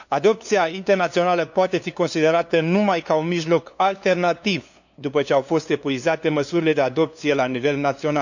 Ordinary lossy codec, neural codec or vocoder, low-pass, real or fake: none; codec, 16 kHz, 2 kbps, FunCodec, trained on LibriTTS, 25 frames a second; 7.2 kHz; fake